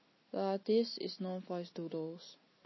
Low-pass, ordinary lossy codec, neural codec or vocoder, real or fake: 7.2 kHz; MP3, 24 kbps; none; real